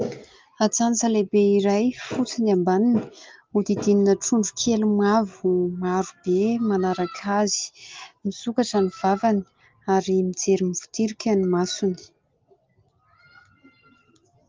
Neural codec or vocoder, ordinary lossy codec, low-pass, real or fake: none; Opus, 32 kbps; 7.2 kHz; real